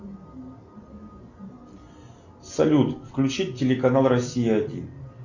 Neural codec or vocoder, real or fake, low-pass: none; real; 7.2 kHz